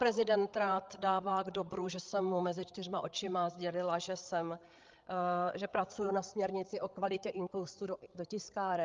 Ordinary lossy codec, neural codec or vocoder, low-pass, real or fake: Opus, 32 kbps; codec, 16 kHz, 8 kbps, FreqCodec, larger model; 7.2 kHz; fake